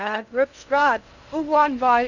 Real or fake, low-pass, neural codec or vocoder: fake; 7.2 kHz; codec, 16 kHz in and 24 kHz out, 0.6 kbps, FocalCodec, streaming, 2048 codes